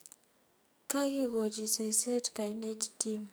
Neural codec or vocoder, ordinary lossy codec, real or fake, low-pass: codec, 44.1 kHz, 2.6 kbps, SNAC; none; fake; none